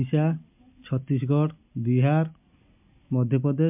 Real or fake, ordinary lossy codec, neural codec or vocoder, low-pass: real; MP3, 32 kbps; none; 3.6 kHz